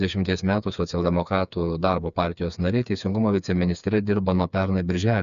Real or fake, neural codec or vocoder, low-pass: fake; codec, 16 kHz, 4 kbps, FreqCodec, smaller model; 7.2 kHz